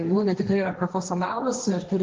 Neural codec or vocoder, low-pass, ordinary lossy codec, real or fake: codec, 16 kHz, 1.1 kbps, Voila-Tokenizer; 7.2 kHz; Opus, 24 kbps; fake